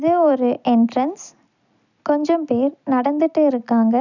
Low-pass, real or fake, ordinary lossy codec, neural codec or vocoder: 7.2 kHz; real; none; none